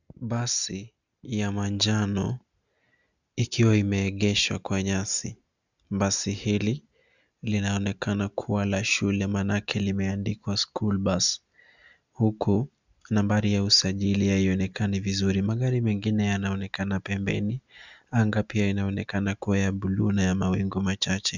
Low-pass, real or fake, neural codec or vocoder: 7.2 kHz; real; none